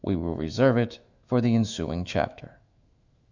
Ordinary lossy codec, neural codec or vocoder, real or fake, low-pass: Opus, 64 kbps; autoencoder, 48 kHz, 128 numbers a frame, DAC-VAE, trained on Japanese speech; fake; 7.2 kHz